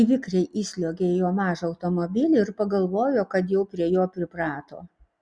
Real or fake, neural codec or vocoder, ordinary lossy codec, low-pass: real; none; Opus, 64 kbps; 9.9 kHz